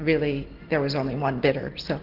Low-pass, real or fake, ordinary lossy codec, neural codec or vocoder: 5.4 kHz; real; Opus, 16 kbps; none